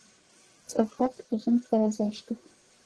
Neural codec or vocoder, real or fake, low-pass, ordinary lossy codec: codec, 44.1 kHz, 1.7 kbps, Pupu-Codec; fake; 10.8 kHz; Opus, 16 kbps